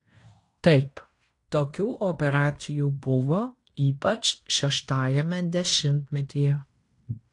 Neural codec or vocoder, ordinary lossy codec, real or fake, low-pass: codec, 16 kHz in and 24 kHz out, 0.9 kbps, LongCat-Audio-Codec, fine tuned four codebook decoder; AAC, 48 kbps; fake; 10.8 kHz